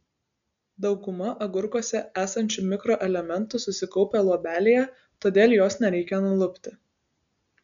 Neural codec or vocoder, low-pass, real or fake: none; 7.2 kHz; real